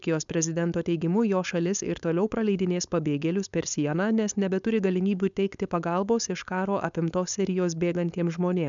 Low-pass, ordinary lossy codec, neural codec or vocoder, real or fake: 7.2 kHz; MP3, 96 kbps; codec, 16 kHz, 4.8 kbps, FACodec; fake